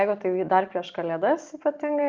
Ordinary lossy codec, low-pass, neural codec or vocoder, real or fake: Opus, 32 kbps; 7.2 kHz; none; real